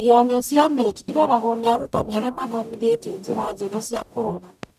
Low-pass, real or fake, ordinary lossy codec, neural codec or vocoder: 14.4 kHz; fake; none; codec, 44.1 kHz, 0.9 kbps, DAC